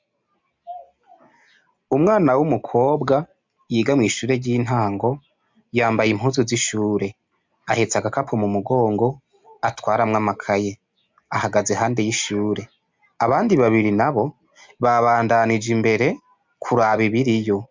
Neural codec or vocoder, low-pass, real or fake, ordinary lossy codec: none; 7.2 kHz; real; MP3, 64 kbps